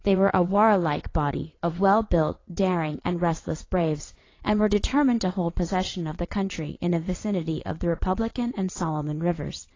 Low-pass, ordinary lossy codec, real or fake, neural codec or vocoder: 7.2 kHz; AAC, 32 kbps; fake; vocoder, 22.05 kHz, 80 mel bands, WaveNeXt